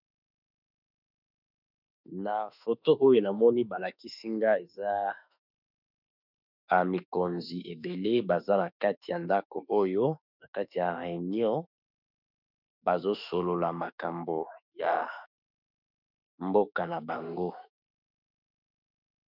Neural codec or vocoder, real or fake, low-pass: autoencoder, 48 kHz, 32 numbers a frame, DAC-VAE, trained on Japanese speech; fake; 5.4 kHz